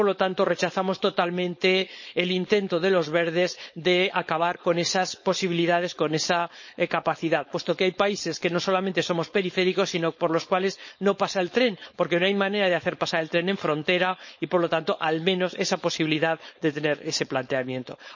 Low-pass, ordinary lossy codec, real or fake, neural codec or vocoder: 7.2 kHz; MP3, 32 kbps; fake; codec, 16 kHz, 4.8 kbps, FACodec